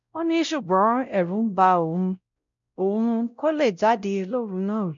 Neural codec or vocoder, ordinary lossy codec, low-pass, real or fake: codec, 16 kHz, 0.5 kbps, X-Codec, WavLM features, trained on Multilingual LibriSpeech; none; 7.2 kHz; fake